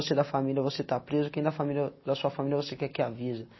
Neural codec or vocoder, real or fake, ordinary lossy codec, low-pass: none; real; MP3, 24 kbps; 7.2 kHz